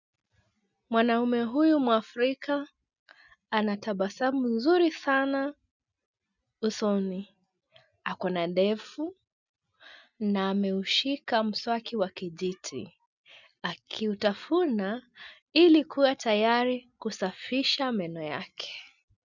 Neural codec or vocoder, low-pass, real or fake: none; 7.2 kHz; real